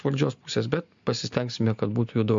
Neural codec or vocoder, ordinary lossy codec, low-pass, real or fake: none; MP3, 48 kbps; 7.2 kHz; real